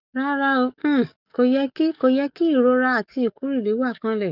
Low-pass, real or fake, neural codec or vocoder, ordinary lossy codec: 5.4 kHz; fake; codec, 44.1 kHz, 7.8 kbps, DAC; none